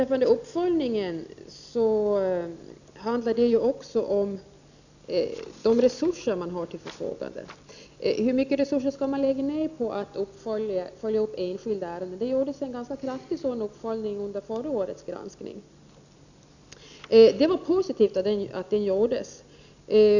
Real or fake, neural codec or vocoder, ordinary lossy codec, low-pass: real; none; none; 7.2 kHz